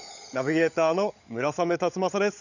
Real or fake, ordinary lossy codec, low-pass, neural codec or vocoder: fake; none; 7.2 kHz; codec, 16 kHz, 16 kbps, FunCodec, trained on LibriTTS, 50 frames a second